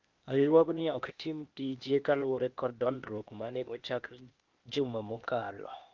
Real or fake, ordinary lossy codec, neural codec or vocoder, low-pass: fake; Opus, 24 kbps; codec, 16 kHz, 0.8 kbps, ZipCodec; 7.2 kHz